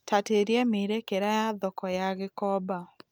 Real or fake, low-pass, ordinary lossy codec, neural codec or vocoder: real; none; none; none